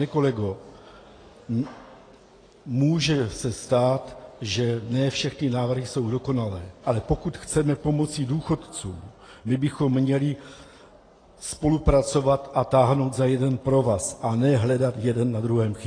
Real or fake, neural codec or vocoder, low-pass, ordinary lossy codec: real; none; 9.9 kHz; AAC, 32 kbps